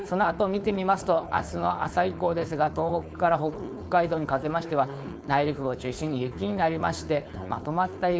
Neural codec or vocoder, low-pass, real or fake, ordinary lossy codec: codec, 16 kHz, 4.8 kbps, FACodec; none; fake; none